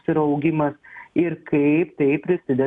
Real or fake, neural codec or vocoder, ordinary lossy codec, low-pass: real; none; MP3, 96 kbps; 10.8 kHz